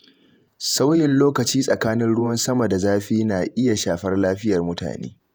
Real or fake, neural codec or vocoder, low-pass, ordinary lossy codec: fake; vocoder, 48 kHz, 128 mel bands, Vocos; none; none